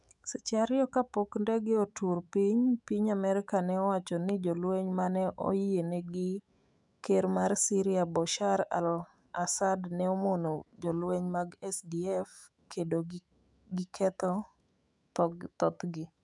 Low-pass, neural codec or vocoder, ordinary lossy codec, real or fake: 10.8 kHz; autoencoder, 48 kHz, 128 numbers a frame, DAC-VAE, trained on Japanese speech; none; fake